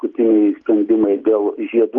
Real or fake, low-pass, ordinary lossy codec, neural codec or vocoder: real; 7.2 kHz; Opus, 32 kbps; none